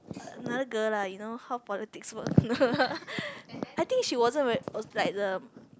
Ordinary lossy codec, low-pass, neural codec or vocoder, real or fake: none; none; none; real